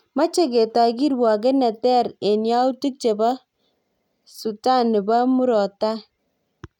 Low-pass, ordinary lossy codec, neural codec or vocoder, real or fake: 19.8 kHz; none; none; real